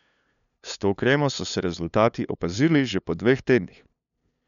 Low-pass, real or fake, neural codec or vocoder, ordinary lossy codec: 7.2 kHz; fake; codec, 16 kHz, 2 kbps, FunCodec, trained on LibriTTS, 25 frames a second; none